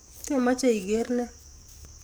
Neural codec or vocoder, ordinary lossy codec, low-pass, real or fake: codec, 44.1 kHz, 7.8 kbps, DAC; none; none; fake